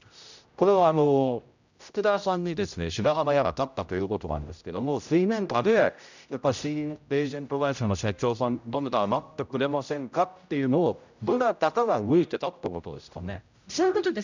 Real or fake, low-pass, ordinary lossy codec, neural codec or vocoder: fake; 7.2 kHz; none; codec, 16 kHz, 0.5 kbps, X-Codec, HuBERT features, trained on general audio